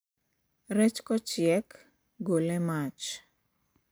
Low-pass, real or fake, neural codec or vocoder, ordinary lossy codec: none; real; none; none